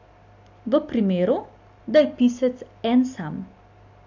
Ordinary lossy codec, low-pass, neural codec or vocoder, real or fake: AAC, 48 kbps; 7.2 kHz; none; real